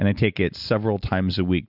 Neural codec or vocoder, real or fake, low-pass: none; real; 5.4 kHz